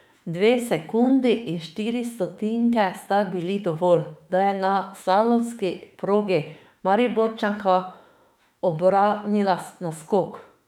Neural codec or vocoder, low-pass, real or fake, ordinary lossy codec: autoencoder, 48 kHz, 32 numbers a frame, DAC-VAE, trained on Japanese speech; 19.8 kHz; fake; none